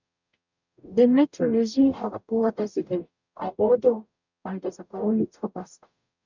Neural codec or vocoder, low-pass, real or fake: codec, 44.1 kHz, 0.9 kbps, DAC; 7.2 kHz; fake